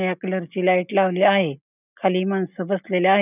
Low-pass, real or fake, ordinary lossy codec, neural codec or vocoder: 3.6 kHz; real; none; none